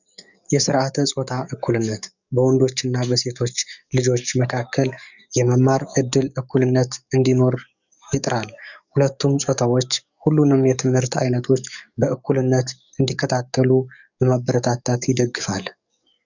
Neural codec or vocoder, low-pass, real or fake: codec, 44.1 kHz, 7.8 kbps, DAC; 7.2 kHz; fake